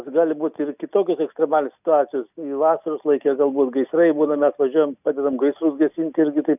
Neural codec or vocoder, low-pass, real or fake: none; 3.6 kHz; real